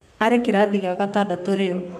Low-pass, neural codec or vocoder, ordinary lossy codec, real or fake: 14.4 kHz; codec, 32 kHz, 1.9 kbps, SNAC; MP3, 96 kbps; fake